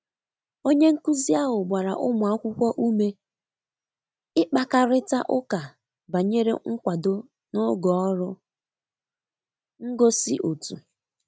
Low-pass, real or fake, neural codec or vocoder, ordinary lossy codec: none; real; none; none